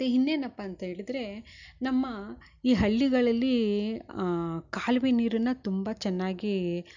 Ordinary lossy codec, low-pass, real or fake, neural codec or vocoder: none; 7.2 kHz; real; none